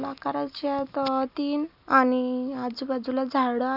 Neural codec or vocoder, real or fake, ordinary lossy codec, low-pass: none; real; none; 5.4 kHz